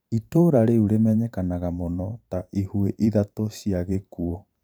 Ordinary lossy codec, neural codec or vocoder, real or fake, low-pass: none; vocoder, 44.1 kHz, 128 mel bands every 512 samples, BigVGAN v2; fake; none